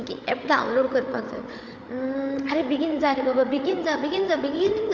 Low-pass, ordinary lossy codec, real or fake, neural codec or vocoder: none; none; fake; codec, 16 kHz, 8 kbps, FreqCodec, larger model